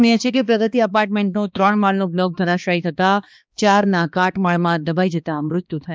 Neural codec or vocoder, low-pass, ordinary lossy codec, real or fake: codec, 16 kHz, 2 kbps, X-Codec, HuBERT features, trained on balanced general audio; none; none; fake